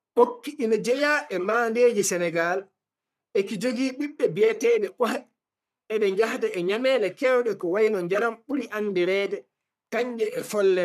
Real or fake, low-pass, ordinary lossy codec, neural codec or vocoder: fake; 14.4 kHz; none; codec, 44.1 kHz, 3.4 kbps, Pupu-Codec